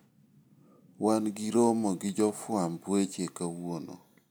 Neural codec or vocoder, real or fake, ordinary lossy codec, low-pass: none; real; none; none